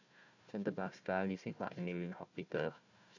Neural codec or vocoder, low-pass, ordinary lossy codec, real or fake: codec, 16 kHz, 1 kbps, FunCodec, trained on Chinese and English, 50 frames a second; 7.2 kHz; none; fake